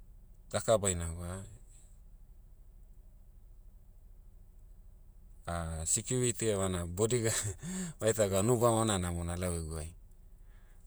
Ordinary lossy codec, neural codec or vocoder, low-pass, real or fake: none; vocoder, 48 kHz, 128 mel bands, Vocos; none; fake